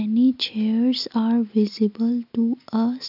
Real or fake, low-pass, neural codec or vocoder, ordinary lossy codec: real; 5.4 kHz; none; AAC, 48 kbps